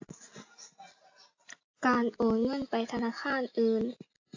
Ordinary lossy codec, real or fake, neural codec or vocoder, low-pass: AAC, 48 kbps; real; none; 7.2 kHz